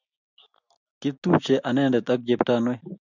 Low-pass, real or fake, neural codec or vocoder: 7.2 kHz; real; none